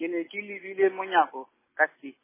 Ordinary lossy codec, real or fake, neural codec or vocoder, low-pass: MP3, 16 kbps; real; none; 3.6 kHz